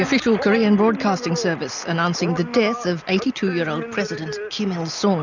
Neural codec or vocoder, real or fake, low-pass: none; real; 7.2 kHz